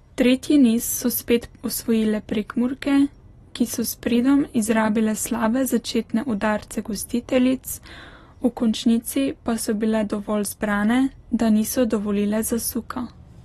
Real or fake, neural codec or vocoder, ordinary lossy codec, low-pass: real; none; AAC, 32 kbps; 19.8 kHz